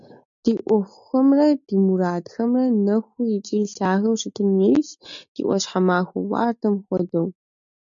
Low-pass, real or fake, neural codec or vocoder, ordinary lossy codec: 7.2 kHz; real; none; MP3, 64 kbps